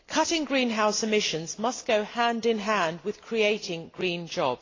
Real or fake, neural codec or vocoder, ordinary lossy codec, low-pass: real; none; AAC, 32 kbps; 7.2 kHz